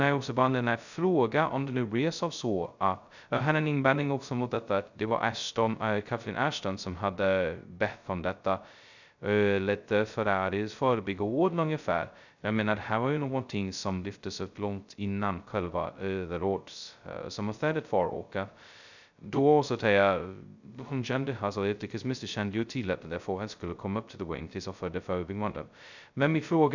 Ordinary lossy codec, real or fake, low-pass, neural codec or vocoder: none; fake; 7.2 kHz; codec, 16 kHz, 0.2 kbps, FocalCodec